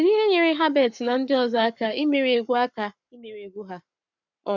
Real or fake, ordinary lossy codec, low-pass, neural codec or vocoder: fake; none; 7.2 kHz; codec, 44.1 kHz, 7.8 kbps, Pupu-Codec